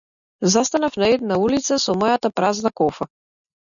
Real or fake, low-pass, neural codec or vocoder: real; 7.2 kHz; none